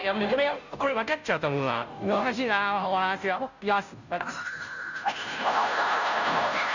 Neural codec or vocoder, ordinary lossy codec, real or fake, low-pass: codec, 16 kHz, 0.5 kbps, FunCodec, trained on Chinese and English, 25 frames a second; none; fake; 7.2 kHz